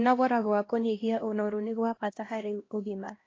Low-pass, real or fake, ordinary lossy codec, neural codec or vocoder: 7.2 kHz; fake; AAC, 32 kbps; codec, 16 kHz, 1 kbps, X-Codec, HuBERT features, trained on LibriSpeech